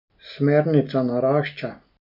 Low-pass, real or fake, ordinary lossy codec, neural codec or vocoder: 5.4 kHz; real; none; none